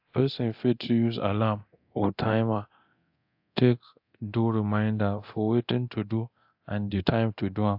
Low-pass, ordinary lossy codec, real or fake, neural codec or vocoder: 5.4 kHz; AAC, 48 kbps; fake; codec, 24 kHz, 0.9 kbps, DualCodec